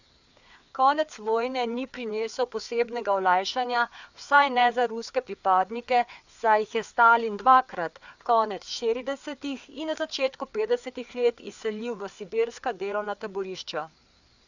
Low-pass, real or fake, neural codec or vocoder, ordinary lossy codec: 7.2 kHz; fake; codec, 16 kHz, 4 kbps, FreqCodec, larger model; none